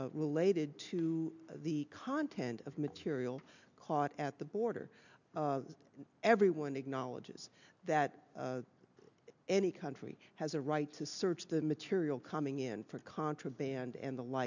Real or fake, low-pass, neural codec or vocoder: real; 7.2 kHz; none